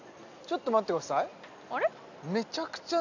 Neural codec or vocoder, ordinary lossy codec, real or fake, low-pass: none; none; real; 7.2 kHz